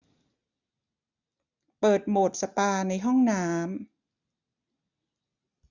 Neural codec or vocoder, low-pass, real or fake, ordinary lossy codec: none; 7.2 kHz; real; none